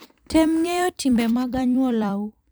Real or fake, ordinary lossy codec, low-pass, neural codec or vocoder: fake; none; none; vocoder, 44.1 kHz, 128 mel bands every 256 samples, BigVGAN v2